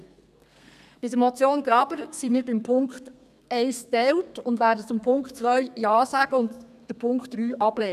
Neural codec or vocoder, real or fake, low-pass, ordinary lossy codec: codec, 32 kHz, 1.9 kbps, SNAC; fake; 14.4 kHz; none